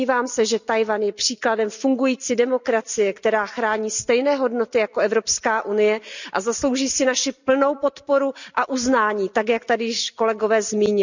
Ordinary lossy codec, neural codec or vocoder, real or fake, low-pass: none; none; real; 7.2 kHz